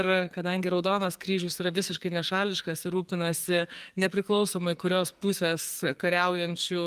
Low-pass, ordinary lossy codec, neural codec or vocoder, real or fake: 14.4 kHz; Opus, 32 kbps; codec, 44.1 kHz, 2.6 kbps, SNAC; fake